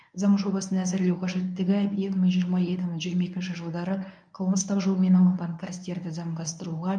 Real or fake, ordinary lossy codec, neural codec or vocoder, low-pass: fake; none; codec, 24 kHz, 0.9 kbps, WavTokenizer, medium speech release version 1; 9.9 kHz